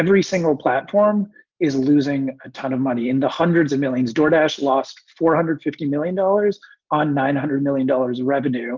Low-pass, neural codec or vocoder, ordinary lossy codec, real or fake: 7.2 kHz; none; Opus, 24 kbps; real